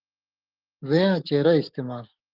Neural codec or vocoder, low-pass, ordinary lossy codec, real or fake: codec, 44.1 kHz, 7.8 kbps, DAC; 5.4 kHz; Opus, 32 kbps; fake